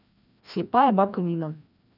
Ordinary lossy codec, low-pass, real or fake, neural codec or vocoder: none; 5.4 kHz; fake; codec, 16 kHz, 1 kbps, FreqCodec, larger model